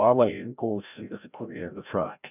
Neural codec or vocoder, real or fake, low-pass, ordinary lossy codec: codec, 16 kHz, 0.5 kbps, FreqCodec, larger model; fake; 3.6 kHz; none